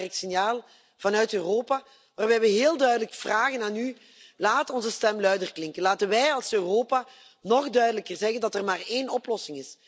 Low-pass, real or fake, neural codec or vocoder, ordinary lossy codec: none; real; none; none